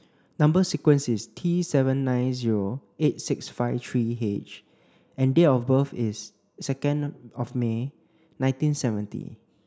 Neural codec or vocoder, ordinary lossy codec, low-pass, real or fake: none; none; none; real